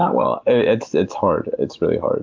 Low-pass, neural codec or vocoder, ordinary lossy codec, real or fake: 7.2 kHz; none; Opus, 24 kbps; real